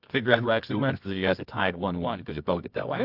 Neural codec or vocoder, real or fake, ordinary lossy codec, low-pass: codec, 24 kHz, 0.9 kbps, WavTokenizer, medium music audio release; fake; AAC, 48 kbps; 5.4 kHz